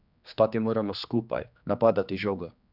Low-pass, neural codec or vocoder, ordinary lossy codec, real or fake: 5.4 kHz; codec, 16 kHz, 2 kbps, X-Codec, HuBERT features, trained on general audio; none; fake